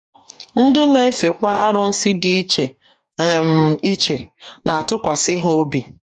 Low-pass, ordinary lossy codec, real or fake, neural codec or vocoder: 10.8 kHz; none; fake; codec, 44.1 kHz, 2.6 kbps, DAC